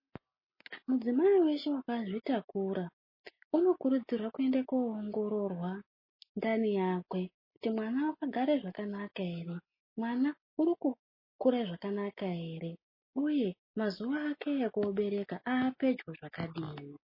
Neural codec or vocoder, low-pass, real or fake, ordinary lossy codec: none; 5.4 kHz; real; MP3, 24 kbps